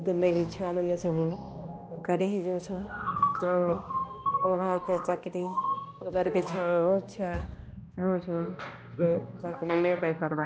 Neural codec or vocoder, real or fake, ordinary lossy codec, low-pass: codec, 16 kHz, 1 kbps, X-Codec, HuBERT features, trained on balanced general audio; fake; none; none